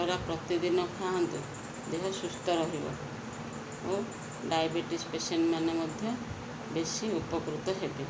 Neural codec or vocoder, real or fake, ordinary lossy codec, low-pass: none; real; none; none